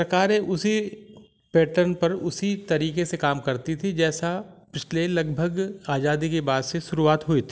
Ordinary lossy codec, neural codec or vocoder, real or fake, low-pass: none; none; real; none